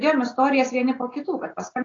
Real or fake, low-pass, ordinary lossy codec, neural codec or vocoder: real; 7.2 kHz; AAC, 32 kbps; none